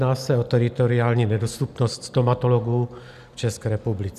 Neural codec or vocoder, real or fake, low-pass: none; real; 14.4 kHz